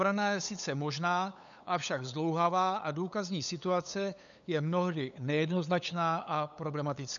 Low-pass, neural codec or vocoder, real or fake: 7.2 kHz; codec, 16 kHz, 8 kbps, FunCodec, trained on LibriTTS, 25 frames a second; fake